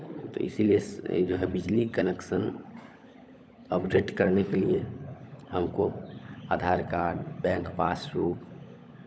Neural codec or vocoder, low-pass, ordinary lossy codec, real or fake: codec, 16 kHz, 16 kbps, FunCodec, trained on LibriTTS, 50 frames a second; none; none; fake